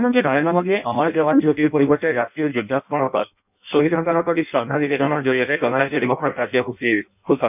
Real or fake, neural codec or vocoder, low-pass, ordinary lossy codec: fake; codec, 16 kHz in and 24 kHz out, 0.6 kbps, FireRedTTS-2 codec; 3.6 kHz; none